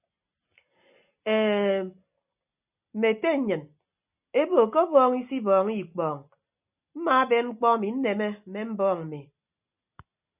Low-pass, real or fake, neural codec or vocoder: 3.6 kHz; real; none